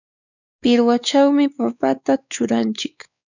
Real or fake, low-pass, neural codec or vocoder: fake; 7.2 kHz; codec, 16 kHz, 4 kbps, X-Codec, WavLM features, trained on Multilingual LibriSpeech